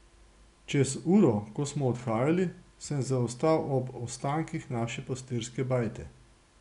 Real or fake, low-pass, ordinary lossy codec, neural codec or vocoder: real; 10.8 kHz; none; none